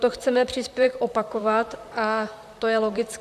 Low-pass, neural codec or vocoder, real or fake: 14.4 kHz; vocoder, 44.1 kHz, 128 mel bands, Pupu-Vocoder; fake